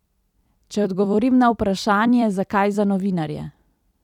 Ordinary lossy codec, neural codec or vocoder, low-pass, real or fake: none; vocoder, 44.1 kHz, 128 mel bands every 256 samples, BigVGAN v2; 19.8 kHz; fake